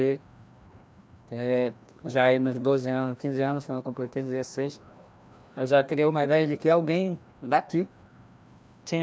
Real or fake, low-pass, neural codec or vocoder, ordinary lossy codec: fake; none; codec, 16 kHz, 1 kbps, FreqCodec, larger model; none